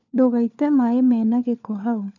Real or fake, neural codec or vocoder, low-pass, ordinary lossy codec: fake; codec, 16 kHz, 4 kbps, FunCodec, trained on LibriTTS, 50 frames a second; 7.2 kHz; none